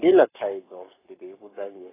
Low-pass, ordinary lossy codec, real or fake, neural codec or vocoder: 3.6 kHz; AAC, 16 kbps; fake; codec, 44.1 kHz, 7.8 kbps, Pupu-Codec